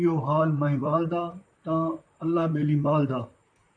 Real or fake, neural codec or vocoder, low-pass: fake; vocoder, 44.1 kHz, 128 mel bands, Pupu-Vocoder; 9.9 kHz